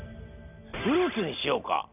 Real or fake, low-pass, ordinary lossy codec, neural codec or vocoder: real; 3.6 kHz; none; none